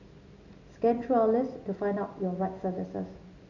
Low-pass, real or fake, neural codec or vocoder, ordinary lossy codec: 7.2 kHz; real; none; none